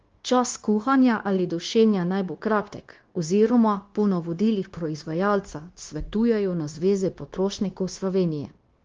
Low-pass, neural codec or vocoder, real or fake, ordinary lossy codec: 7.2 kHz; codec, 16 kHz, 0.9 kbps, LongCat-Audio-Codec; fake; Opus, 16 kbps